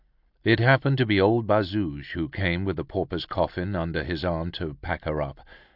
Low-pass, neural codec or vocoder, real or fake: 5.4 kHz; none; real